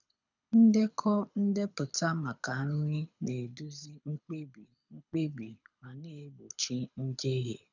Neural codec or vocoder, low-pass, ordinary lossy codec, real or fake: codec, 24 kHz, 6 kbps, HILCodec; 7.2 kHz; none; fake